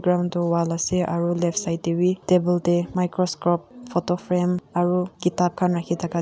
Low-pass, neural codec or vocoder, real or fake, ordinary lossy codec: none; none; real; none